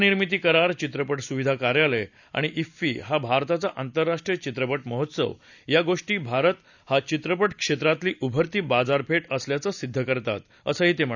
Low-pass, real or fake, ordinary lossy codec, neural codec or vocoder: 7.2 kHz; real; none; none